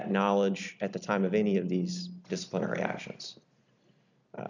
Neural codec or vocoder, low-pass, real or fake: none; 7.2 kHz; real